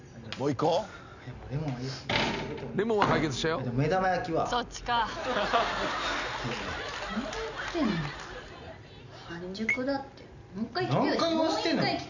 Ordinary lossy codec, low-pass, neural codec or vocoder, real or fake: none; 7.2 kHz; none; real